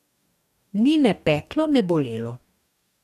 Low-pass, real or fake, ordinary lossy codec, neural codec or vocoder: 14.4 kHz; fake; none; codec, 44.1 kHz, 2.6 kbps, DAC